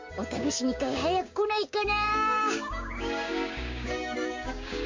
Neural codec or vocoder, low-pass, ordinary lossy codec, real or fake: codec, 16 kHz, 6 kbps, DAC; 7.2 kHz; MP3, 48 kbps; fake